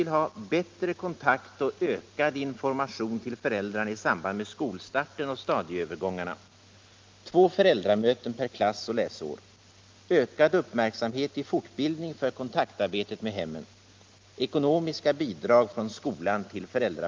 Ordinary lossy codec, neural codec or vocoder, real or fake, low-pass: Opus, 24 kbps; none; real; 7.2 kHz